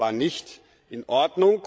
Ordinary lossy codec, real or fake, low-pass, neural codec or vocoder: none; fake; none; codec, 16 kHz, 16 kbps, FreqCodec, larger model